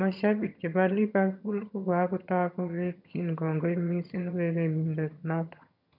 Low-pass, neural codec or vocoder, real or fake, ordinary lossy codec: 5.4 kHz; vocoder, 22.05 kHz, 80 mel bands, HiFi-GAN; fake; none